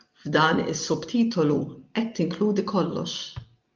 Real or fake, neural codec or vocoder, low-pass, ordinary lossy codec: real; none; 7.2 kHz; Opus, 32 kbps